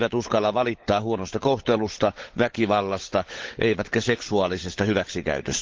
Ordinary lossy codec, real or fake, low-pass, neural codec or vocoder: Opus, 16 kbps; fake; 7.2 kHz; codec, 16 kHz, 16 kbps, FunCodec, trained on LibriTTS, 50 frames a second